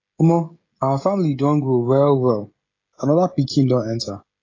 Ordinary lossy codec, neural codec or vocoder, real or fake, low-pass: AAC, 32 kbps; codec, 16 kHz, 16 kbps, FreqCodec, smaller model; fake; 7.2 kHz